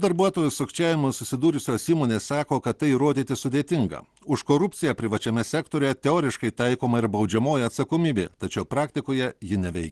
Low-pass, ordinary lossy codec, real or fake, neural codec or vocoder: 10.8 kHz; Opus, 16 kbps; real; none